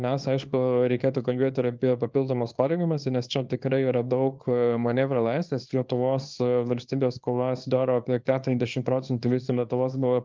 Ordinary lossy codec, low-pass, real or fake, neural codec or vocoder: Opus, 32 kbps; 7.2 kHz; fake; codec, 24 kHz, 0.9 kbps, WavTokenizer, small release